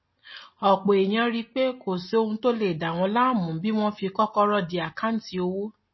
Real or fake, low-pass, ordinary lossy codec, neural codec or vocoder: real; 7.2 kHz; MP3, 24 kbps; none